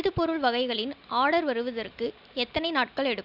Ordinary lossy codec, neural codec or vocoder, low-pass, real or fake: none; none; 5.4 kHz; real